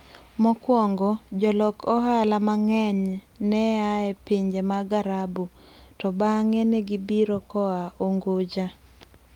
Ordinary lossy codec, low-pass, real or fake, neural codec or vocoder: Opus, 32 kbps; 19.8 kHz; real; none